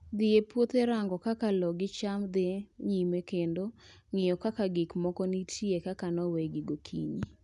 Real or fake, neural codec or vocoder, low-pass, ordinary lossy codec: real; none; 10.8 kHz; none